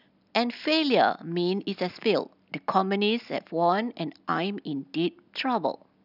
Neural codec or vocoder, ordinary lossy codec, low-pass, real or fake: none; none; 5.4 kHz; real